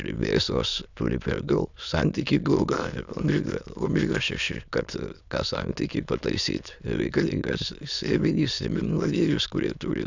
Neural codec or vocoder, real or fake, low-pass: autoencoder, 22.05 kHz, a latent of 192 numbers a frame, VITS, trained on many speakers; fake; 7.2 kHz